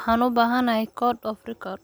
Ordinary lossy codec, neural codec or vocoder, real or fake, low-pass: none; none; real; none